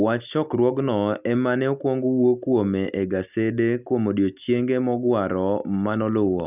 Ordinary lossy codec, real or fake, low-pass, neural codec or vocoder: none; real; 3.6 kHz; none